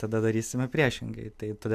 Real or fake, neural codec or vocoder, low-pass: real; none; 14.4 kHz